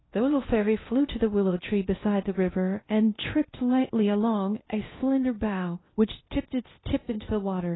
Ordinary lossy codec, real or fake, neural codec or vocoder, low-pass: AAC, 16 kbps; fake; codec, 16 kHz in and 24 kHz out, 0.6 kbps, FocalCodec, streaming, 2048 codes; 7.2 kHz